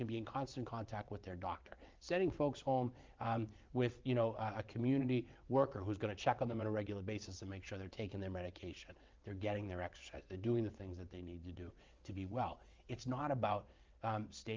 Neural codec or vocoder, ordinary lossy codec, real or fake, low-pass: vocoder, 22.05 kHz, 80 mel bands, WaveNeXt; Opus, 32 kbps; fake; 7.2 kHz